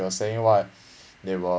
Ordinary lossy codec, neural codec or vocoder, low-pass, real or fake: none; none; none; real